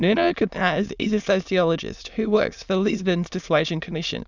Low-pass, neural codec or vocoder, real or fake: 7.2 kHz; autoencoder, 22.05 kHz, a latent of 192 numbers a frame, VITS, trained on many speakers; fake